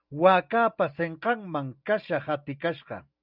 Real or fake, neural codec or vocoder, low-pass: real; none; 5.4 kHz